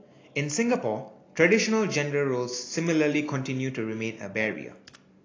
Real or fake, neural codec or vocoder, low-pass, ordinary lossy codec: real; none; 7.2 kHz; AAC, 32 kbps